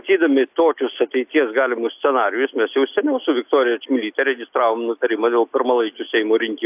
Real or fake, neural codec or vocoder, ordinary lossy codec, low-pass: real; none; AAC, 32 kbps; 3.6 kHz